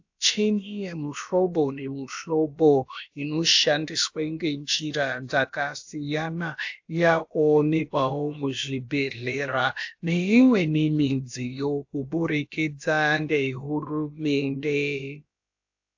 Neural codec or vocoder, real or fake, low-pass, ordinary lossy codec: codec, 16 kHz, about 1 kbps, DyCAST, with the encoder's durations; fake; 7.2 kHz; AAC, 48 kbps